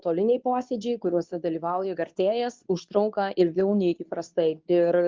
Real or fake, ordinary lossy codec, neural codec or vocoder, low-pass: fake; Opus, 24 kbps; codec, 24 kHz, 0.9 kbps, WavTokenizer, medium speech release version 2; 7.2 kHz